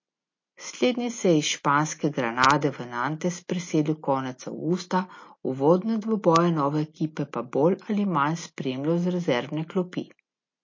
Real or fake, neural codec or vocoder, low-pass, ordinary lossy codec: real; none; 7.2 kHz; MP3, 32 kbps